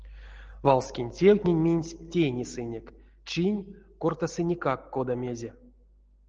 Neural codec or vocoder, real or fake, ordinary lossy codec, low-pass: none; real; Opus, 16 kbps; 7.2 kHz